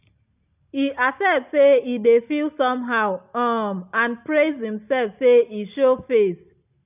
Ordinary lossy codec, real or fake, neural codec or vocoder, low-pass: none; real; none; 3.6 kHz